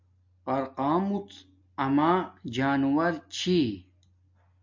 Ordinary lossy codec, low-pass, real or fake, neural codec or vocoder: MP3, 64 kbps; 7.2 kHz; real; none